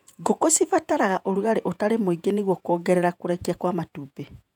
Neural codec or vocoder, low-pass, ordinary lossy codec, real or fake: vocoder, 48 kHz, 128 mel bands, Vocos; 19.8 kHz; none; fake